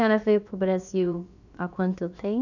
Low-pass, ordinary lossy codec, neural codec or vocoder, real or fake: 7.2 kHz; none; codec, 16 kHz, about 1 kbps, DyCAST, with the encoder's durations; fake